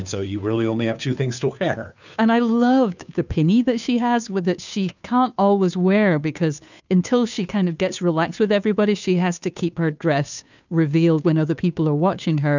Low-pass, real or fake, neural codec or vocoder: 7.2 kHz; fake; codec, 16 kHz, 2 kbps, FunCodec, trained on Chinese and English, 25 frames a second